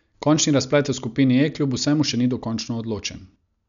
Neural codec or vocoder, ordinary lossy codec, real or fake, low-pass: none; none; real; 7.2 kHz